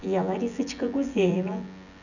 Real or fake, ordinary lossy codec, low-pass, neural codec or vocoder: fake; none; 7.2 kHz; vocoder, 24 kHz, 100 mel bands, Vocos